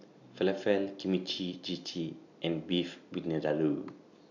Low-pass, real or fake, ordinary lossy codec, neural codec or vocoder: 7.2 kHz; real; none; none